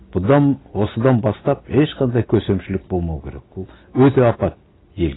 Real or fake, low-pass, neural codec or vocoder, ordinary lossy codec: real; 7.2 kHz; none; AAC, 16 kbps